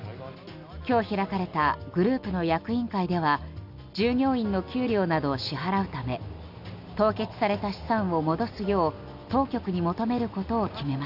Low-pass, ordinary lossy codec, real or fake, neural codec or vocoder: 5.4 kHz; none; real; none